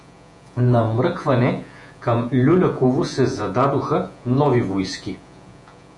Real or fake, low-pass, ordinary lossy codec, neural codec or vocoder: fake; 10.8 kHz; MP3, 96 kbps; vocoder, 48 kHz, 128 mel bands, Vocos